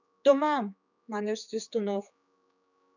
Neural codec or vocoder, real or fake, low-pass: codec, 16 kHz, 4 kbps, X-Codec, HuBERT features, trained on general audio; fake; 7.2 kHz